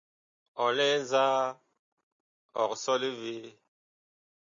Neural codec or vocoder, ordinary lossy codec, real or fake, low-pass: none; MP3, 48 kbps; real; 7.2 kHz